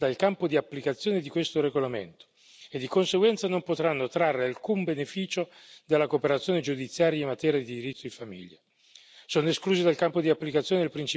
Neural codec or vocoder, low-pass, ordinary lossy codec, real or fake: none; none; none; real